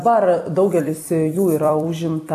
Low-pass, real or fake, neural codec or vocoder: 14.4 kHz; fake; vocoder, 44.1 kHz, 128 mel bands every 256 samples, BigVGAN v2